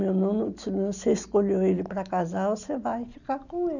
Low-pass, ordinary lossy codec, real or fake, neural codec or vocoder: 7.2 kHz; none; real; none